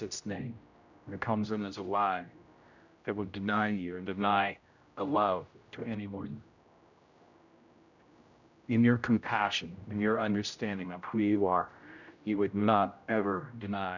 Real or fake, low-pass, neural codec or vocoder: fake; 7.2 kHz; codec, 16 kHz, 0.5 kbps, X-Codec, HuBERT features, trained on general audio